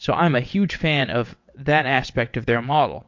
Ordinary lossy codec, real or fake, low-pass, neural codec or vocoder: MP3, 48 kbps; fake; 7.2 kHz; vocoder, 22.05 kHz, 80 mel bands, WaveNeXt